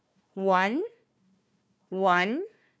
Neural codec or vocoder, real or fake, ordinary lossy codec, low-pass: codec, 16 kHz, 1 kbps, FunCodec, trained on Chinese and English, 50 frames a second; fake; none; none